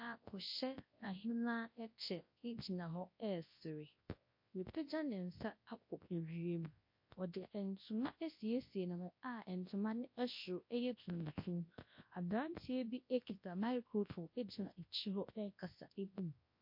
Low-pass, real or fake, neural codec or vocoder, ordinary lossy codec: 5.4 kHz; fake; codec, 24 kHz, 0.9 kbps, WavTokenizer, large speech release; MP3, 32 kbps